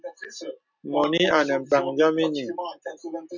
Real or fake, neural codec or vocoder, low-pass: real; none; 7.2 kHz